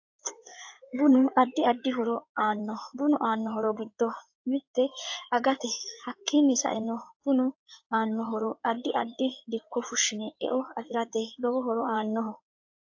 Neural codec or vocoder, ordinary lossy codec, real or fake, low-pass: codec, 16 kHz in and 24 kHz out, 2.2 kbps, FireRedTTS-2 codec; AAC, 48 kbps; fake; 7.2 kHz